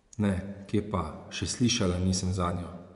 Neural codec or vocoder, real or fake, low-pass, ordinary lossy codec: vocoder, 24 kHz, 100 mel bands, Vocos; fake; 10.8 kHz; none